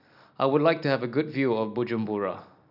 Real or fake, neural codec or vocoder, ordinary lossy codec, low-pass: real; none; none; 5.4 kHz